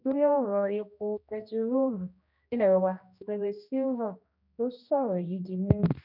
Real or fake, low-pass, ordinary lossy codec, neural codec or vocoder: fake; 5.4 kHz; none; codec, 16 kHz, 1 kbps, X-Codec, HuBERT features, trained on general audio